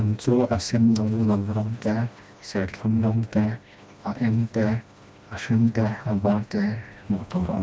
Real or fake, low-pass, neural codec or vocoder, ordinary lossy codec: fake; none; codec, 16 kHz, 1 kbps, FreqCodec, smaller model; none